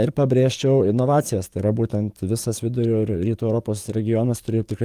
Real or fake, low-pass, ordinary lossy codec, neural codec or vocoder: fake; 14.4 kHz; Opus, 32 kbps; codec, 44.1 kHz, 7.8 kbps, DAC